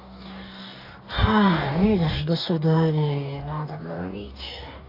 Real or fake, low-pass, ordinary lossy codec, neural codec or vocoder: fake; 5.4 kHz; AAC, 32 kbps; codec, 44.1 kHz, 2.6 kbps, DAC